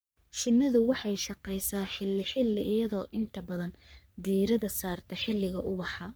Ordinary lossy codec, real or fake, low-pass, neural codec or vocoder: none; fake; none; codec, 44.1 kHz, 3.4 kbps, Pupu-Codec